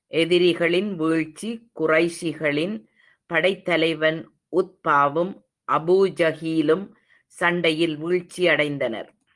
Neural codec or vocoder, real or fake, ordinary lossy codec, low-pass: none; real; Opus, 24 kbps; 10.8 kHz